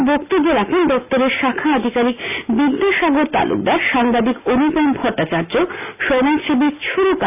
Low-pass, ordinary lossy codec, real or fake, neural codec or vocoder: 3.6 kHz; AAC, 32 kbps; real; none